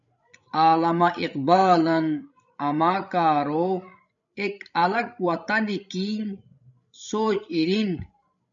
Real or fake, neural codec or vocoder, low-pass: fake; codec, 16 kHz, 16 kbps, FreqCodec, larger model; 7.2 kHz